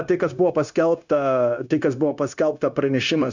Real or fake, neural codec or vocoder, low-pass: fake; codec, 16 kHz, 0.9 kbps, LongCat-Audio-Codec; 7.2 kHz